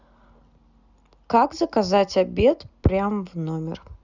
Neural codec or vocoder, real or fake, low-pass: none; real; 7.2 kHz